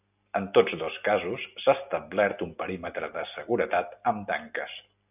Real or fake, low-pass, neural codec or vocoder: real; 3.6 kHz; none